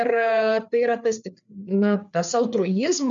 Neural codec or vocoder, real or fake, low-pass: codec, 16 kHz, 4 kbps, FreqCodec, larger model; fake; 7.2 kHz